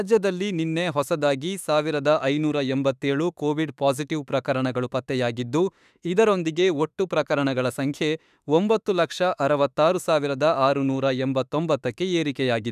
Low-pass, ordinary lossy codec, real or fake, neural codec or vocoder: 14.4 kHz; none; fake; autoencoder, 48 kHz, 32 numbers a frame, DAC-VAE, trained on Japanese speech